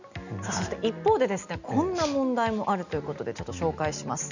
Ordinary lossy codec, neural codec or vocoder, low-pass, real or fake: none; none; 7.2 kHz; real